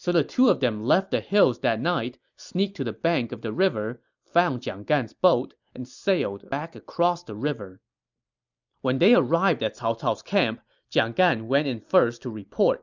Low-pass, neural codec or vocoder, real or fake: 7.2 kHz; none; real